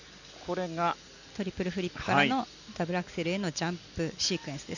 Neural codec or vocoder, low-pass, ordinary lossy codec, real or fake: none; 7.2 kHz; none; real